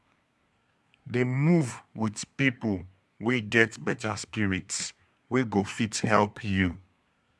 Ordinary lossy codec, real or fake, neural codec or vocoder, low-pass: none; fake; codec, 24 kHz, 1 kbps, SNAC; none